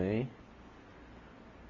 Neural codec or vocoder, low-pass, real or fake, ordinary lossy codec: none; 7.2 kHz; real; AAC, 32 kbps